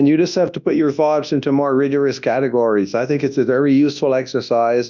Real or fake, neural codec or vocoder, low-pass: fake; codec, 24 kHz, 0.9 kbps, WavTokenizer, large speech release; 7.2 kHz